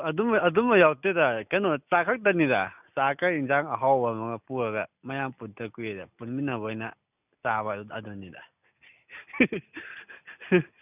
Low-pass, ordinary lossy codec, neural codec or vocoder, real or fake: 3.6 kHz; none; none; real